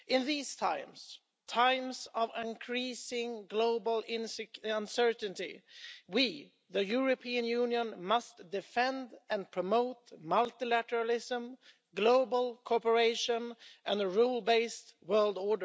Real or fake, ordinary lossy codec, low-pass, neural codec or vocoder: real; none; none; none